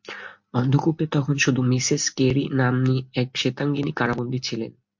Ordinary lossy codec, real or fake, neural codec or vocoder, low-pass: MP3, 48 kbps; real; none; 7.2 kHz